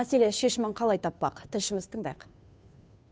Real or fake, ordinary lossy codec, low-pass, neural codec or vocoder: fake; none; none; codec, 16 kHz, 2 kbps, FunCodec, trained on Chinese and English, 25 frames a second